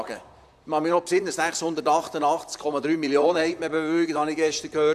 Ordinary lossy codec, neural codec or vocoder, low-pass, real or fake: none; vocoder, 44.1 kHz, 128 mel bands, Pupu-Vocoder; 14.4 kHz; fake